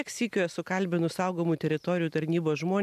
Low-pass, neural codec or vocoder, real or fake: 14.4 kHz; none; real